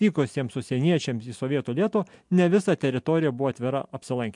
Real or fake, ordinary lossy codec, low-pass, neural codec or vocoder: real; MP3, 64 kbps; 10.8 kHz; none